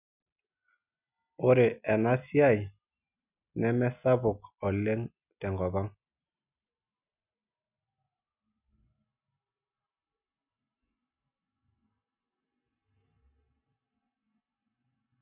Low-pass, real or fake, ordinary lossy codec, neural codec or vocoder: 3.6 kHz; real; none; none